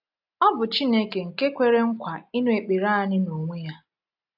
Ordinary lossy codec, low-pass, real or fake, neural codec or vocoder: AAC, 48 kbps; 5.4 kHz; real; none